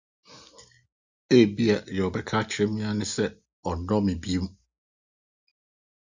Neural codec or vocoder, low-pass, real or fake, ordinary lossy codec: none; 7.2 kHz; real; Opus, 64 kbps